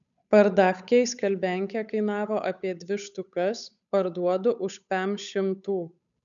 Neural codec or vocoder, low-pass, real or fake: codec, 16 kHz, 8 kbps, FunCodec, trained on Chinese and English, 25 frames a second; 7.2 kHz; fake